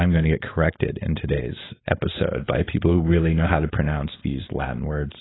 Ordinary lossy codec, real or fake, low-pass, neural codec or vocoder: AAC, 16 kbps; fake; 7.2 kHz; codec, 16 kHz, 4.8 kbps, FACodec